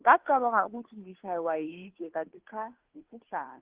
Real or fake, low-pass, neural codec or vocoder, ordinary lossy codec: fake; 3.6 kHz; codec, 16 kHz, 2 kbps, FunCodec, trained on Chinese and English, 25 frames a second; Opus, 16 kbps